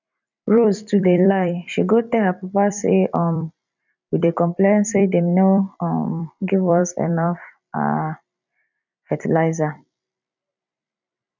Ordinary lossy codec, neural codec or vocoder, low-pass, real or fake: none; vocoder, 44.1 kHz, 128 mel bands every 256 samples, BigVGAN v2; 7.2 kHz; fake